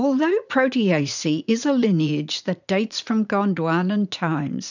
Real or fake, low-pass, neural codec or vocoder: fake; 7.2 kHz; vocoder, 44.1 kHz, 80 mel bands, Vocos